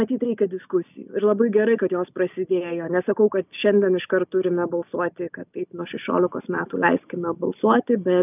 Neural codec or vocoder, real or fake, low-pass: none; real; 3.6 kHz